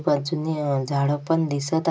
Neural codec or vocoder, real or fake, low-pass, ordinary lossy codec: none; real; none; none